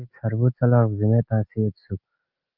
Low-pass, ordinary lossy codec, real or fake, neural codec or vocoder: 5.4 kHz; Opus, 24 kbps; real; none